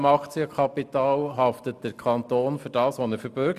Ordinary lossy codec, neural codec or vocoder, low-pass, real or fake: none; none; 14.4 kHz; real